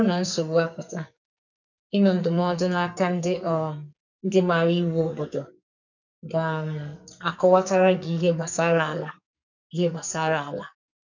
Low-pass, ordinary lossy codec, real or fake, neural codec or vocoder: 7.2 kHz; none; fake; codec, 44.1 kHz, 2.6 kbps, SNAC